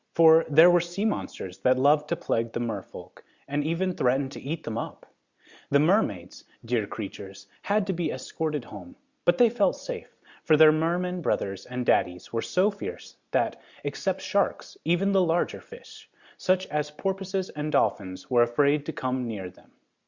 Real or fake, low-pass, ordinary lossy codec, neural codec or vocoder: real; 7.2 kHz; Opus, 64 kbps; none